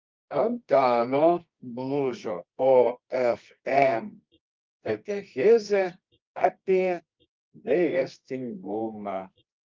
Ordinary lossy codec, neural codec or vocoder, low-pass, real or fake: Opus, 24 kbps; codec, 24 kHz, 0.9 kbps, WavTokenizer, medium music audio release; 7.2 kHz; fake